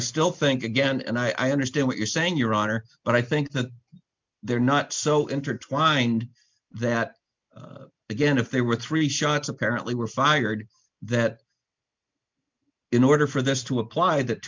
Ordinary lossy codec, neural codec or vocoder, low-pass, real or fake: MP3, 64 kbps; none; 7.2 kHz; real